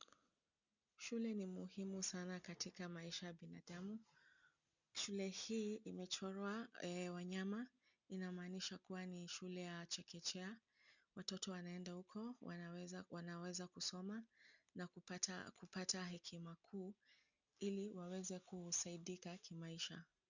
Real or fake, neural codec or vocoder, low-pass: real; none; 7.2 kHz